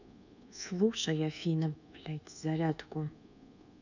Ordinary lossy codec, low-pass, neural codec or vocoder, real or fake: none; 7.2 kHz; codec, 24 kHz, 1.2 kbps, DualCodec; fake